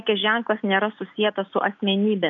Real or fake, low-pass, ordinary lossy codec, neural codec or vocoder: real; 7.2 kHz; AAC, 64 kbps; none